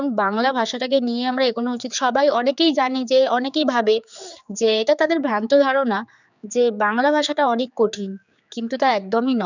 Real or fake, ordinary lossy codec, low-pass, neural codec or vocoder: fake; none; 7.2 kHz; codec, 16 kHz, 4 kbps, X-Codec, HuBERT features, trained on general audio